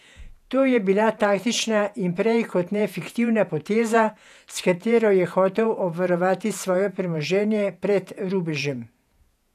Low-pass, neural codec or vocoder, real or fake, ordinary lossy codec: 14.4 kHz; vocoder, 48 kHz, 128 mel bands, Vocos; fake; none